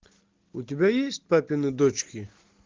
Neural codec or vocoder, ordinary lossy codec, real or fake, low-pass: none; Opus, 32 kbps; real; 7.2 kHz